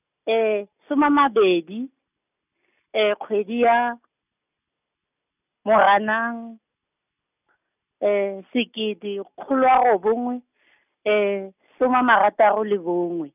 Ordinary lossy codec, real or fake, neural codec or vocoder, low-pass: none; real; none; 3.6 kHz